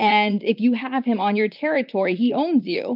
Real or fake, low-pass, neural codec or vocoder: fake; 5.4 kHz; vocoder, 44.1 kHz, 128 mel bands, Pupu-Vocoder